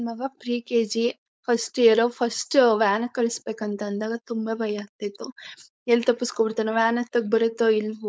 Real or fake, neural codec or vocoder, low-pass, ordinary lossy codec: fake; codec, 16 kHz, 4.8 kbps, FACodec; none; none